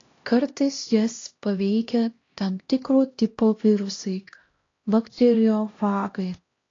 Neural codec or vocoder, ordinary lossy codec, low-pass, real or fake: codec, 16 kHz, 1 kbps, X-Codec, HuBERT features, trained on LibriSpeech; AAC, 32 kbps; 7.2 kHz; fake